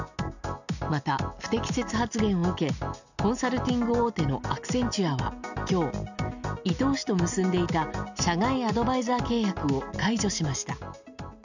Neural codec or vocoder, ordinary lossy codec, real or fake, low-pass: none; none; real; 7.2 kHz